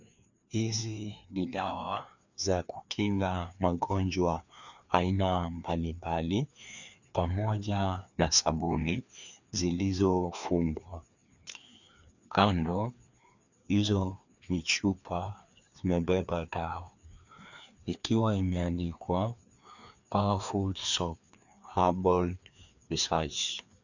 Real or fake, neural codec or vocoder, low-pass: fake; codec, 16 kHz, 2 kbps, FreqCodec, larger model; 7.2 kHz